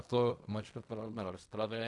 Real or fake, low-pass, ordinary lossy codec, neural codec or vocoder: fake; 10.8 kHz; AAC, 64 kbps; codec, 16 kHz in and 24 kHz out, 0.4 kbps, LongCat-Audio-Codec, fine tuned four codebook decoder